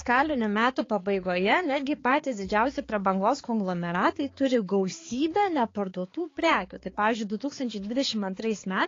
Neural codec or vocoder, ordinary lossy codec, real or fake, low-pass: codec, 16 kHz, 4 kbps, X-Codec, HuBERT features, trained on balanced general audio; AAC, 32 kbps; fake; 7.2 kHz